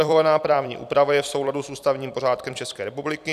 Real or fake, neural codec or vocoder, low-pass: fake; vocoder, 44.1 kHz, 128 mel bands every 256 samples, BigVGAN v2; 14.4 kHz